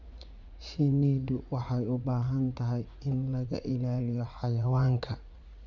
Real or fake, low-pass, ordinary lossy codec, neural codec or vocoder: fake; 7.2 kHz; none; vocoder, 44.1 kHz, 80 mel bands, Vocos